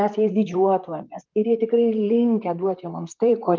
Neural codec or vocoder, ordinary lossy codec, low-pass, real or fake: vocoder, 44.1 kHz, 128 mel bands, Pupu-Vocoder; Opus, 32 kbps; 7.2 kHz; fake